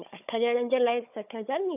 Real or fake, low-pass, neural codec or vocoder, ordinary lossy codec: fake; 3.6 kHz; codec, 16 kHz, 16 kbps, FunCodec, trained on Chinese and English, 50 frames a second; none